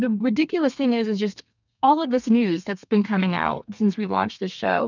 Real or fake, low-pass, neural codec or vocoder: fake; 7.2 kHz; codec, 32 kHz, 1.9 kbps, SNAC